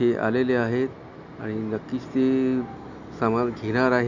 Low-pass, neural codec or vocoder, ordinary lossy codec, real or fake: 7.2 kHz; none; none; real